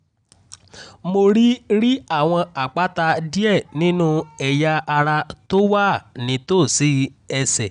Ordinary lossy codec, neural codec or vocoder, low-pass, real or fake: none; none; 9.9 kHz; real